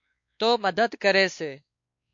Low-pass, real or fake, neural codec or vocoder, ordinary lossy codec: 7.2 kHz; fake; codec, 16 kHz, 2 kbps, X-Codec, WavLM features, trained on Multilingual LibriSpeech; MP3, 48 kbps